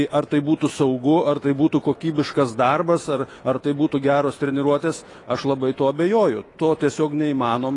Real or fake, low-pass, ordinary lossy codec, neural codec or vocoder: fake; 10.8 kHz; AAC, 32 kbps; autoencoder, 48 kHz, 128 numbers a frame, DAC-VAE, trained on Japanese speech